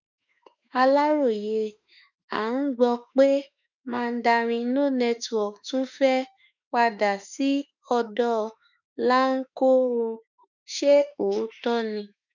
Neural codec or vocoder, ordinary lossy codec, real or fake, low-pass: autoencoder, 48 kHz, 32 numbers a frame, DAC-VAE, trained on Japanese speech; none; fake; 7.2 kHz